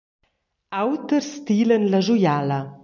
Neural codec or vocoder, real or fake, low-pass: none; real; 7.2 kHz